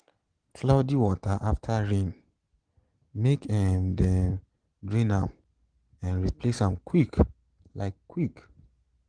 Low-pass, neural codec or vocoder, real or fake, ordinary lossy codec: 9.9 kHz; none; real; Opus, 16 kbps